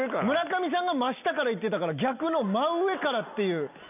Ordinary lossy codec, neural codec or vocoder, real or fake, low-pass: none; none; real; 3.6 kHz